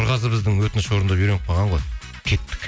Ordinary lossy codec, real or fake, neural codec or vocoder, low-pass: none; real; none; none